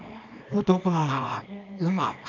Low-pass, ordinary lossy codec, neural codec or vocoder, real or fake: 7.2 kHz; MP3, 64 kbps; codec, 24 kHz, 0.9 kbps, WavTokenizer, small release; fake